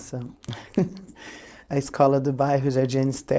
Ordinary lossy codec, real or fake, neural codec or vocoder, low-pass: none; fake; codec, 16 kHz, 4.8 kbps, FACodec; none